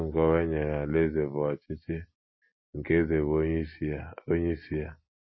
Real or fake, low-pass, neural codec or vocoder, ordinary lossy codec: real; 7.2 kHz; none; MP3, 24 kbps